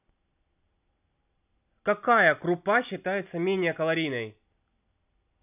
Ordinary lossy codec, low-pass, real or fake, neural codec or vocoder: none; 3.6 kHz; real; none